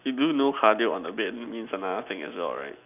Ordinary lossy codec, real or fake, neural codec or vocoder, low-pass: none; real; none; 3.6 kHz